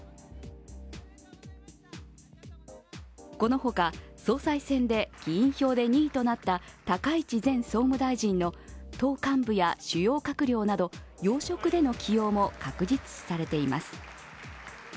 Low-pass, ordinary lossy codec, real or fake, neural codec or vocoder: none; none; real; none